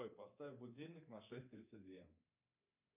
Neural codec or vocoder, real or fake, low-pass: codec, 24 kHz, 3.1 kbps, DualCodec; fake; 3.6 kHz